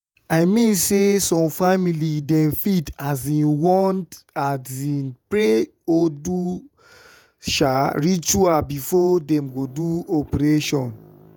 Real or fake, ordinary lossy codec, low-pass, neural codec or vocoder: fake; none; none; vocoder, 48 kHz, 128 mel bands, Vocos